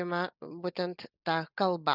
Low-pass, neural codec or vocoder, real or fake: 5.4 kHz; none; real